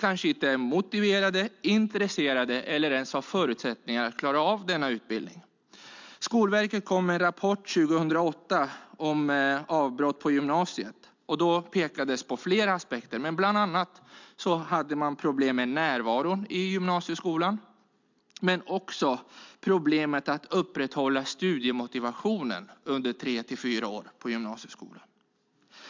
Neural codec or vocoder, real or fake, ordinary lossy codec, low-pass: none; real; MP3, 64 kbps; 7.2 kHz